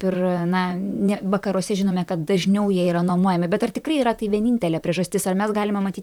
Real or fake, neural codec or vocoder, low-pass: fake; vocoder, 44.1 kHz, 128 mel bands, Pupu-Vocoder; 19.8 kHz